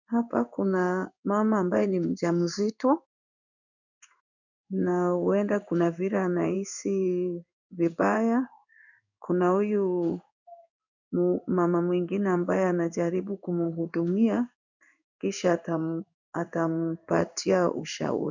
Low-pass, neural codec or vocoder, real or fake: 7.2 kHz; codec, 16 kHz in and 24 kHz out, 1 kbps, XY-Tokenizer; fake